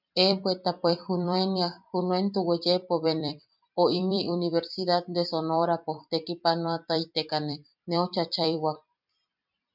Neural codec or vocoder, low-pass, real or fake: vocoder, 44.1 kHz, 128 mel bands every 256 samples, BigVGAN v2; 5.4 kHz; fake